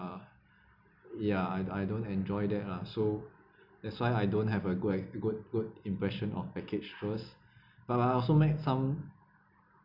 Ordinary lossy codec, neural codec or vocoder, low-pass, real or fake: none; none; 5.4 kHz; real